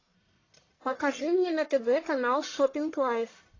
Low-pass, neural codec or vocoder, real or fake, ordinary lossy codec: 7.2 kHz; codec, 44.1 kHz, 1.7 kbps, Pupu-Codec; fake; AAC, 32 kbps